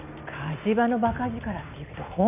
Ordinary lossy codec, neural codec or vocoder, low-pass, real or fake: none; none; 3.6 kHz; real